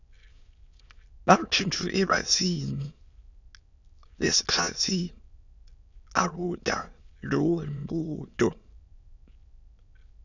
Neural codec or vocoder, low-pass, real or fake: autoencoder, 22.05 kHz, a latent of 192 numbers a frame, VITS, trained on many speakers; 7.2 kHz; fake